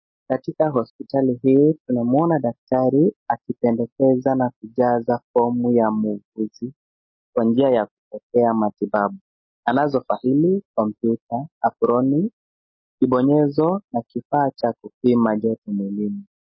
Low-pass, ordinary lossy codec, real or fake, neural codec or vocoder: 7.2 kHz; MP3, 24 kbps; real; none